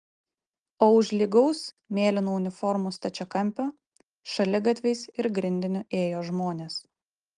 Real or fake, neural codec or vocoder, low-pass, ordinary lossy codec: real; none; 9.9 kHz; Opus, 24 kbps